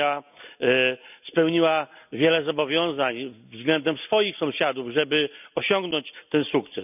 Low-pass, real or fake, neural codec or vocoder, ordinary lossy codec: 3.6 kHz; real; none; none